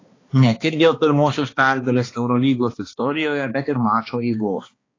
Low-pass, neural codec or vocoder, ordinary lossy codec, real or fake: 7.2 kHz; codec, 16 kHz, 2 kbps, X-Codec, HuBERT features, trained on balanced general audio; AAC, 32 kbps; fake